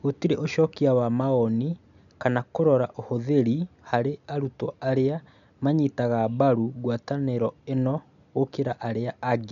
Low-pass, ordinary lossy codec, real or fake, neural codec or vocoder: 7.2 kHz; none; real; none